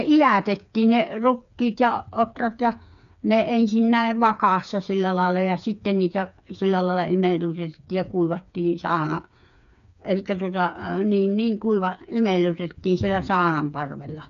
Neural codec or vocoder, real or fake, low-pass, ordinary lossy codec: codec, 16 kHz, 4 kbps, FreqCodec, smaller model; fake; 7.2 kHz; none